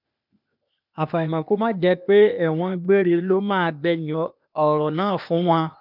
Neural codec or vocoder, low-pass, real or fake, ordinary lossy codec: codec, 16 kHz, 0.8 kbps, ZipCodec; 5.4 kHz; fake; none